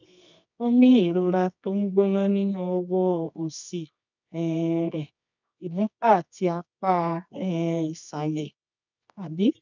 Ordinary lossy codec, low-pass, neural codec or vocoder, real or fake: none; 7.2 kHz; codec, 24 kHz, 0.9 kbps, WavTokenizer, medium music audio release; fake